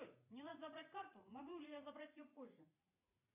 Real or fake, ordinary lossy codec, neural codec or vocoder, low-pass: fake; MP3, 32 kbps; vocoder, 44.1 kHz, 80 mel bands, Vocos; 3.6 kHz